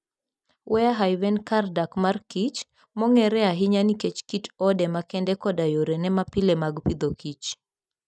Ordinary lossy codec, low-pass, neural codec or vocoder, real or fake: none; none; none; real